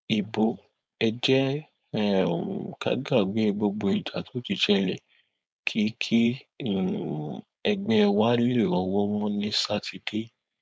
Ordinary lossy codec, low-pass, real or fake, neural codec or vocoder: none; none; fake; codec, 16 kHz, 4.8 kbps, FACodec